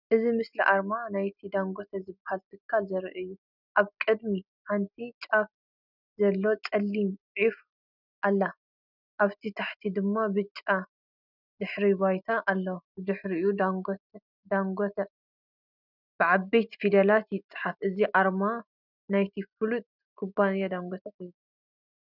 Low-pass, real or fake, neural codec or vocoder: 5.4 kHz; real; none